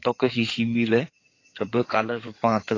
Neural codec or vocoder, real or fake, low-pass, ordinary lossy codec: codec, 16 kHz in and 24 kHz out, 2.2 kbps, FireRedTTS-2 codec; fake; 7.2 kHz; AAC, 32 kbps